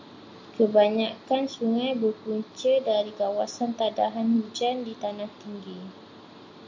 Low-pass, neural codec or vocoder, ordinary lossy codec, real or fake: 7.2 kHz; none; MP3, 48 kbps; real